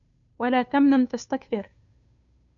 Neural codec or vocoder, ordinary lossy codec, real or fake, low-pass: codec, 16 kHz, 2 kbps, FunCodec, trained on Chinese and English, 25 frames a second; MP3, 96 kbps; fake; 7.2 kHz